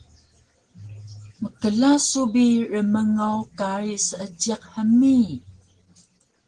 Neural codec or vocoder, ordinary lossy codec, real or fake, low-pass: none; Opus, 16 kbps; real; 9.9 kHz